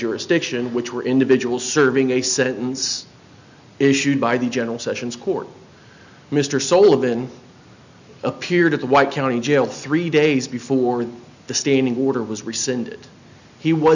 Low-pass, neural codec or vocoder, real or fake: 7.2 kHz; none; real